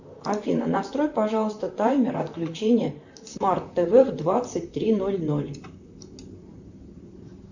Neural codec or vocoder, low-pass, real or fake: vocoder, 44.1 kHz, 128 mel bands, Pupu-Vocoder; 7.2 kHz; fake